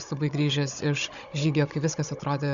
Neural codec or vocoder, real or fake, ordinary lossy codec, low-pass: codec, 16 kHz, 16 kbps, FunCodec, trained on Chinese and English, 50 frames a second; fake; Opus, 64 kbps; 7.2 kHz